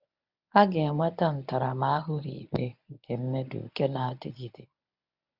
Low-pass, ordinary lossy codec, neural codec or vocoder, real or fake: 5.4 kHz; none; codec, 24 kHz, 0.9 kbps, WavTokenizer, medium speech release version 1; fake